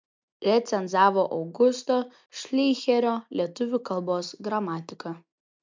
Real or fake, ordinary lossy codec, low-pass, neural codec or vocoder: real; MP3, 64 kbps; 7.2 kHz; none